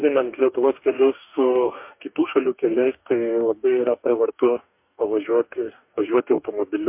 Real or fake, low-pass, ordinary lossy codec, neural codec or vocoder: fake; 3.6 kHz; MP3, 32 kbps; codec, 44.1 kHz, 2.6 kbps, DAC